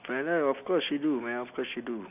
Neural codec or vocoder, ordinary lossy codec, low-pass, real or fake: none; none; 3.6 kHz; real